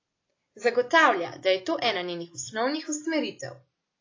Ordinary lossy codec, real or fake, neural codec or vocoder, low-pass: AAC, 32 kbps; real; none; 7.2 kHz